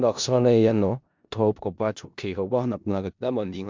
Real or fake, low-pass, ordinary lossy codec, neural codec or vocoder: fake; 7.2 kHz; MP3, 64 kbps; codec, 16 kHz in and 24 kHz out, 0.4 kbps, LongCat-Audio-Codec, four codebook decoder